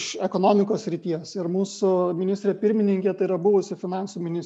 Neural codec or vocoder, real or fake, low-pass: none; real; 10.8 kHz